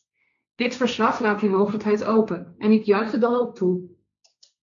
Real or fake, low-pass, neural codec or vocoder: fake; 7.2 kHz; codec, 16 kHz, 1.1 kbps, Voila-Tokenizer